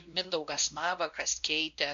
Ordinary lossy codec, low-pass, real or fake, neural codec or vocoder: MP3, 48 kbps; 7.2 kHz; fake; codec, 16 kHz, 1 kbps, X-Codec, WavLM features, trained on Multilingual LibriSpeech